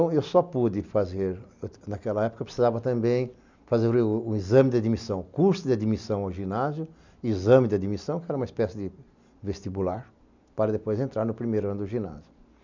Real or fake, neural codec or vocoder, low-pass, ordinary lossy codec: real; none; 7.2 kHz; none